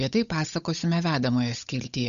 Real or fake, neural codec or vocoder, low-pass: fake; codec, 16 kHz, 8 kbps, FunCodec, trained on Chinese and English, 25 frames a second; 7.2 kHz